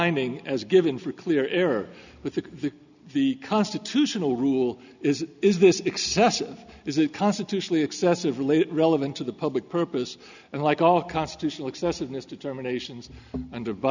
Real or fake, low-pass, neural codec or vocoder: real; 7.2 kHz; none